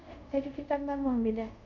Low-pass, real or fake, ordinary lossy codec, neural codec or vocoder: 7.2 kHz; fake; none; codec, 24 kHz, 0.5 kbps, DualCodec